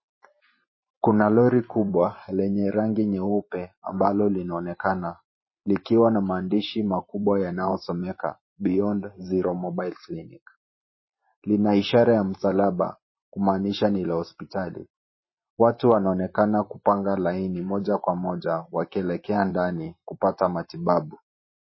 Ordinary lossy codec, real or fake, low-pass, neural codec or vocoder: MP3, 24 kbps; fake; 7.2 kHz; vocoder, 44.1 kHz, 128 mel bands every 512 samples, BigVGAN v2